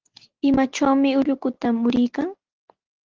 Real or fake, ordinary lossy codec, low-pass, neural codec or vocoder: real; Opus, 16 kbps; 7.2 kHz; none